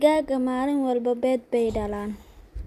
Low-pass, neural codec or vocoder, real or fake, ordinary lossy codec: 14.4 kHz; none; real; none